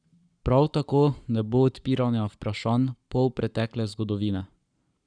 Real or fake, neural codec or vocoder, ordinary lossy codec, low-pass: fake; codec, 44.1 kHz, 7.8 kbps, Pupu-Codec; none; 9.9 kHz